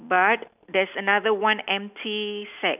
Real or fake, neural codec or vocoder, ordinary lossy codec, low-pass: real; none; none; 3.6 kHz